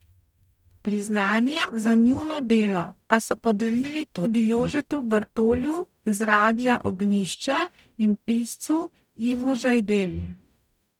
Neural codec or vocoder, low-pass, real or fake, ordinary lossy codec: codec, 44.1 kHz, 0.9 kbps, DAC; 19.8 kHz; fake; none